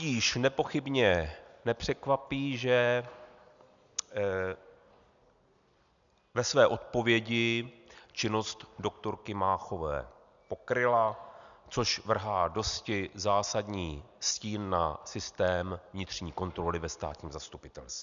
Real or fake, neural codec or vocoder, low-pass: real; none; 7.2 kHz